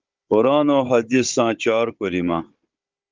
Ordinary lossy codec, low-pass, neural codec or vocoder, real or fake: Opus, 24 kbps; 7.2 kHz; codec, 16 kHz, 16 kbps, FunCodec, trained on Chinese and English, 50 frames a second; fake